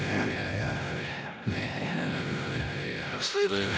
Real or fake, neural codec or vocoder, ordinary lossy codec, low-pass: fake; codec, 16 kHz, 0.5 kbps, X-Codec, WavLM features, trained on Multilingual LibriSpeech; none; none